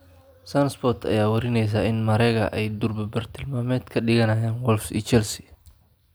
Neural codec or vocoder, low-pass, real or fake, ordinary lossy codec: none; none; real; none